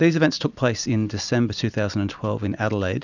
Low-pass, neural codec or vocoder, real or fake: 7.2 kHz; none; real